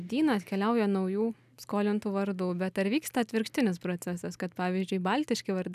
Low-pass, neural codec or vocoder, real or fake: 14.4 kHz; none; real